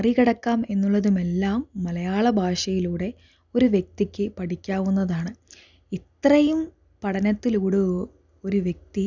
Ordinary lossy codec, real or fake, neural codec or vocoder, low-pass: none; real; none; 7.2 kHz